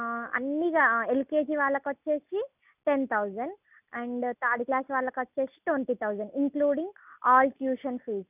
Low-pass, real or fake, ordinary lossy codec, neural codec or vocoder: 3.6 kHz; real; none; none